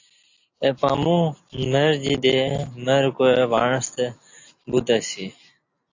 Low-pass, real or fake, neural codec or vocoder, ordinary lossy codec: 7.2 kHz; real; none; AAC, 48 kbps